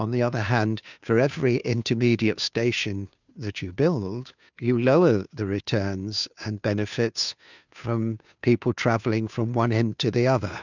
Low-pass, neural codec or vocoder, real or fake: 7.2 kHz; codec, 16 kHz, 2 kbps, FunCodec, trained on Chinese and English, 25 frames a second; fake